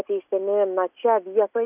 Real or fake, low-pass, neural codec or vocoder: real; 3.6 kHz; none